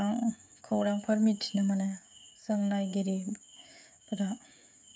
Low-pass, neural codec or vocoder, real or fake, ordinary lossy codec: none; codec, 16 kHz, 16 kbps, FreqCodec, smaller model; fake; none